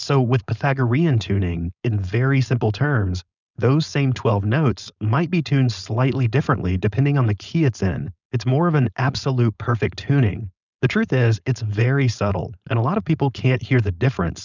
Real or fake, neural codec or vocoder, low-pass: fake; codec, 16 kHz, 4.8 kbps, FACodec; 7.2 kHz